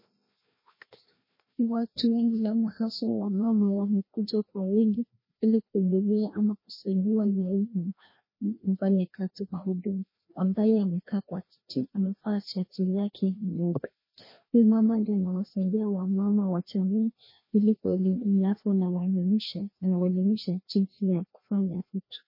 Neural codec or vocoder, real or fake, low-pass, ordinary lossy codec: codec, 16 kHz, 1 kbps, FreqCodec, larger model; fake; 5.4 kHz; MP3, 24 kbps